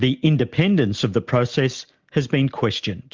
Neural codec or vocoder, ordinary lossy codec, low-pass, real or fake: none; Opus, 24 kbps; 7.2 kHz; real